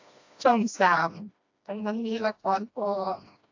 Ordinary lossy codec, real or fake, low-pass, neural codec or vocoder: AAC, 48 kbps; fake; 7.2 kHz; codec, 16 kHz, 1 kbps, FreqCodec, smaller model